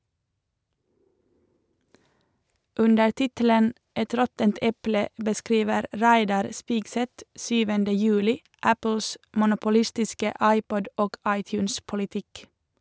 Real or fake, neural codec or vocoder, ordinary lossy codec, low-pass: real; none; none; none